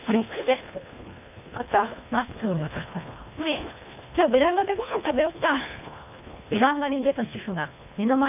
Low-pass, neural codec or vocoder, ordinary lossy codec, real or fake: 3.6 kHz; codec, 24 kHz, 1.5 kbps, HILCodec; none; fake